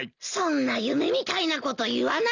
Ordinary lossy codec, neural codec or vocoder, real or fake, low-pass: none; none; real; 7.2 kHz